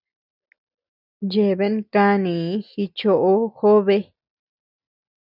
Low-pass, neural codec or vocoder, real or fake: 5.4 kHz; none; real